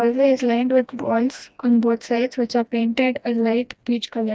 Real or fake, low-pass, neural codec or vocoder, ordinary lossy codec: fake; none; codec, 16 kHz, 1 kbps, FreqCodec, smaller model; none